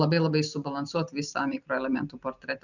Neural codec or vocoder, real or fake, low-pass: none; real; 7.2 kHz